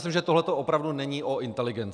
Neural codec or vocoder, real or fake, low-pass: none; real; 9.9 kHz